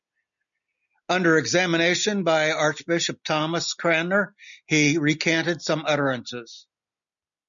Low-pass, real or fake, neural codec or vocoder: 7.2 kHz; real; none